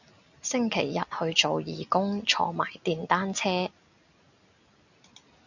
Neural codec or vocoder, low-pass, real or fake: none; 7.2 kHz; real